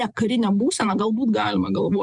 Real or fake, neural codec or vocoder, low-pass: fake; vocoder, 44.1 kHz, 128 mel bands every 512 samples, BigVGAN v2; 10.8 kHz